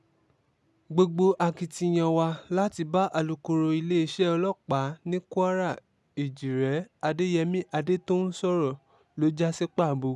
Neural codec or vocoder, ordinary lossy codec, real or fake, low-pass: none; none; real; none